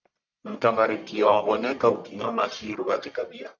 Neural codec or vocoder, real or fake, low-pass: codec, 44.1 kHz, 1.7 kbps, Pupu-Codec; fake; 7.2 kHz